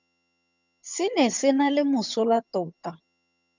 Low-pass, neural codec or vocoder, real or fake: 7.2 kHz; vocoder, 22.05 kHz, 80 mel bands, HiFi-GAN; fake